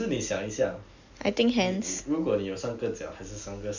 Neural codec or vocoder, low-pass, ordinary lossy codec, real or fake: none; 7.2 kHz; none; real